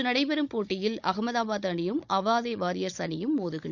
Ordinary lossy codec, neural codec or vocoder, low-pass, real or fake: none; codec, 16 kHz, 16 kbps, FunCodec, trained on Chinese and English, 50 frames a second; 7.2 kHz; fake